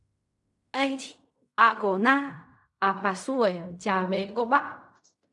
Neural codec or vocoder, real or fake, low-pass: codec, 16 kHz in and 24 kHz out, 0.4 kbps, LongCat-Audio-Codec, fine tuned four codebook decoder; fake; 10.8 kHz